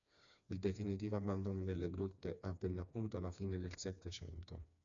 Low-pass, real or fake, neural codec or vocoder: 7.2 kHz; fake; codec, 16 kHz, 2 kbps, FreqCodec, smaller model